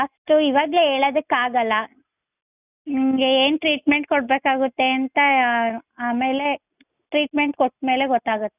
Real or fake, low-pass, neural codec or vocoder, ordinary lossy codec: real; 3.6 kHz; none; none